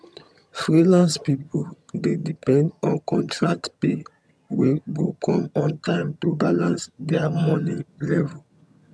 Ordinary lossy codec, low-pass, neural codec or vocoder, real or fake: none; none; vocoder, 22.05 kHz, 80 mel bands, HiFi-GAN; fake